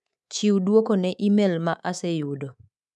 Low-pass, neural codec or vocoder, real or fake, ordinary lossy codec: none; codec, 24 kHz, 3.1 kbps, DualCodec; fake; none